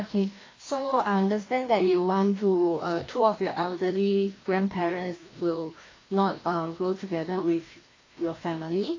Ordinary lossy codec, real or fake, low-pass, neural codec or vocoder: AAC, 32 kbps; fake; 7.2 kHz; codec, 16 kHz, 1 kbps, FreqCodec, larger model